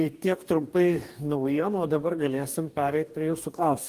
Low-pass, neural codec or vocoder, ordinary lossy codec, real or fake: 14.4 kHz; codec, 44.1 kHz, 2.6 kbps, DAC; Opus, 32 kbps; fake